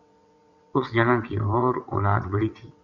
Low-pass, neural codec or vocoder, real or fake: 7.2 kHz; vocoder, 22.05 kHz, 80 mel bands, WaveNeXt; fake